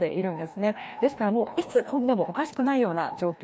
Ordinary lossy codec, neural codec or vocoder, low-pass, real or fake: none; codec, 16 kHz, 1 kbps, FreqCodec, larger model; none; fake